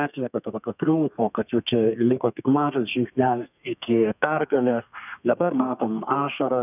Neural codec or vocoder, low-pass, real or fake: codec, 32 kHz, 1.9 kbps, SNAC; 3.6 kHz; fake